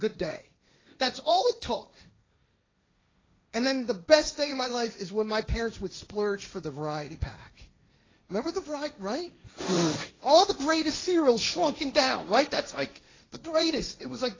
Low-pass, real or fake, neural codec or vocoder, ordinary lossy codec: 7.2 kHz; fake; codec, 16 kHz, 1.1 kbps, Voila-Tokenizer; AAC, 32 kbps